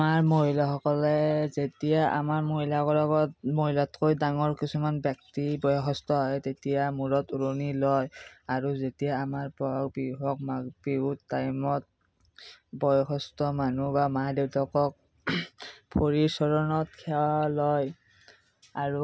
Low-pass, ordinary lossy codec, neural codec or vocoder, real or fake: none; none; none; real